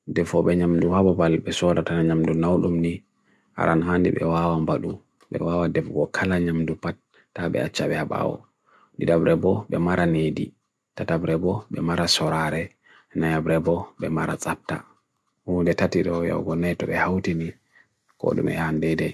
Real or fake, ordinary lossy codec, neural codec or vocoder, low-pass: real; none; none; none